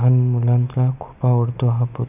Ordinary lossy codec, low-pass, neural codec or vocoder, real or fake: none; 3.6 kHz; none; real